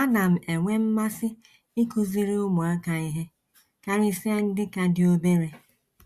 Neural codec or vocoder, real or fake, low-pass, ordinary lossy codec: none; real; 14.4 kHz; Opus, 64 kbps